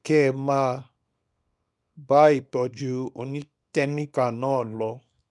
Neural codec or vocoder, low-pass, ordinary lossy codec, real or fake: codec, 24 kHz, 0.9 kbps, WavTokenizer, small release; 10.8 kHz; none; fake